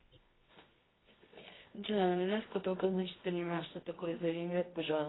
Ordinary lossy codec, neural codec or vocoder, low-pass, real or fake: AAC, 16 kbps; codec, 24 kHz, 0.9 kbps, WavTokenizer, medium music audio release; 7.2 kHz; fake